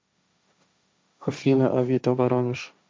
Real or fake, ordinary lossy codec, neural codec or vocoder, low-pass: fake; none; codec, 16 kHz, 1.1 kbps, Voila-Tokenizer; none